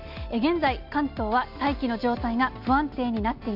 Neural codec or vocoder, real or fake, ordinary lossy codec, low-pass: none; real; AAC, 48 kbps; 5.4 kHz